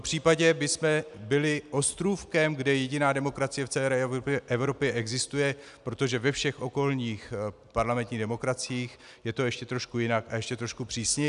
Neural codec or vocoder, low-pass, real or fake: none; 10.8 kHz; real